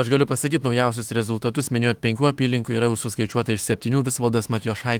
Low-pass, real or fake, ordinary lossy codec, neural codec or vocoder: 19.8 kHz; fake; Opus, 24 kbps; autoencoder, 48 kHz, 32 numbers a frame, DAC-VAE, trained on Japanese speech